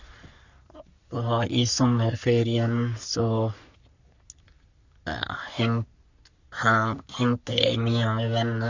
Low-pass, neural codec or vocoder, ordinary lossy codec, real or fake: 7.2 kHz; codec, 44.1 kHz, 3.4 kbps, Pupu-Codec; Opus, 64 kbps; fake